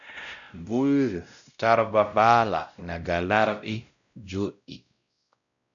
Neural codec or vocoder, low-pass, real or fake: codec, 16 kHz, 0.5 kbps, X-Codec, WavLM features, trained on Multilingual LibriSpeech; 7.2 kHz; fake